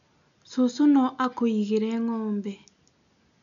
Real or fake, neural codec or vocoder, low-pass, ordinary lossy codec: real; none; 7.2 kHz; none